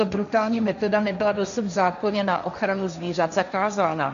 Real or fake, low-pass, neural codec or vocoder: fake; 7.2 kHz; codec, 16 kHz, 1.1 kbps, Voila-Tokenizer